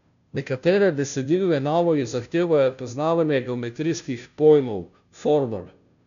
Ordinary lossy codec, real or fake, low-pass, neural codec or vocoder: none; fake; 7.2 kHz; codec, 16 kHz, 0.5 kbps, FunCodec, trained on Chinese and English, 25 frames a second